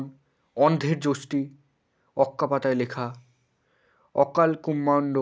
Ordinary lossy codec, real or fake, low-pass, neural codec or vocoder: none; real; none; none